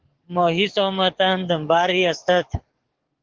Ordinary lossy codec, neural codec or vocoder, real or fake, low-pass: Opus, 16 kbps; codec, 44.1 kHz, 7.8 kbps, DAC; fake; 7.2 kHz